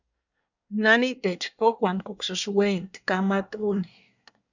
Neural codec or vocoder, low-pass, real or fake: codec, 24 kHz, 1 kbps, SNAC; 7.2 kHz; fake